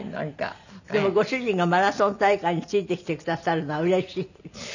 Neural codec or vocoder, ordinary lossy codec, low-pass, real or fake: none; none; 7.2 kHz; real